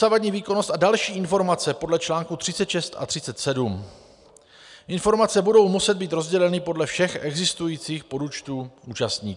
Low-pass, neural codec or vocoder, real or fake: 10.8 kHz; none; real